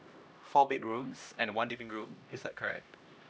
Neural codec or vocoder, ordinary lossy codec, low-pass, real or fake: codec, 16 kHz, 1 kbps, X-Codec, HuBERT features, trained on LibriSpeech; none; none; fake